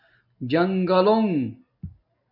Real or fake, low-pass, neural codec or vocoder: real; 5.4 kHz; none